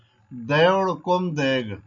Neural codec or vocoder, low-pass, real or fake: none; 7.2 kHz; real